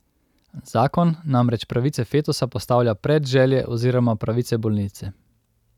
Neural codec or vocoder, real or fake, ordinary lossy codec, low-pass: none; real; none; 19.8 kHz